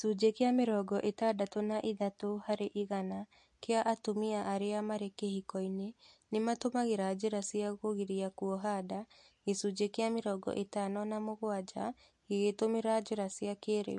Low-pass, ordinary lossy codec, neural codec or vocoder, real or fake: 10.8 kHz; MP3, 48 kbps; none; real